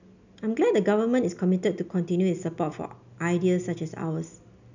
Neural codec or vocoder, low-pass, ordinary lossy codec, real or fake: none; 7.2 kHz; none; real